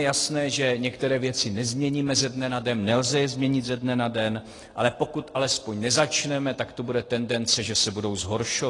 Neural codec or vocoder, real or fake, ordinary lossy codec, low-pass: none; real; AAC, 32 kbps; 10.8 kHz